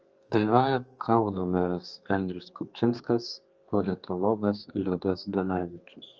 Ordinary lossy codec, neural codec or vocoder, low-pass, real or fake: Opus, 24 kbps; codec, 32 kHz, 1.9 kbps, SNAC; 7.2 kHz; fake